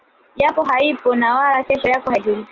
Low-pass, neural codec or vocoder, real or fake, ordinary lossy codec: 7.2 kHz; none; real; Opus, 32 kbps